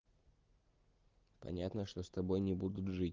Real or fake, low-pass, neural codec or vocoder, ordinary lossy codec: real; 7.2 kHz; none; Opus, 16 kbps